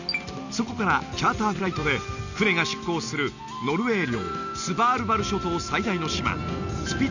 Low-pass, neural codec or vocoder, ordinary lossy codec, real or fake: 7.2 kHz; none; none; real